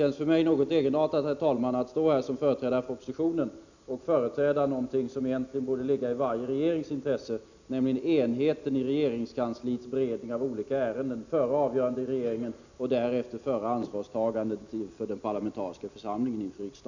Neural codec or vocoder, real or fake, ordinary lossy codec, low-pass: none; real; none; 7.2 kHz